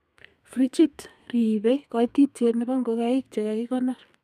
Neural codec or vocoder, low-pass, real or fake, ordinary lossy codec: codec, 32 kHz, 1.9 kbps, SNAC; 14.4 kHz; fake; none